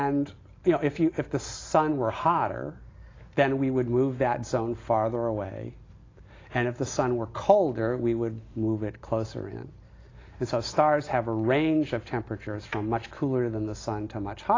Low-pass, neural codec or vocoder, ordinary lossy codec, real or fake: 7.2 kHz; none; AAC, 32 kbps; real